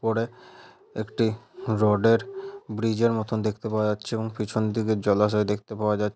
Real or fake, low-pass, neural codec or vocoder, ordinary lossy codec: real; none; none; none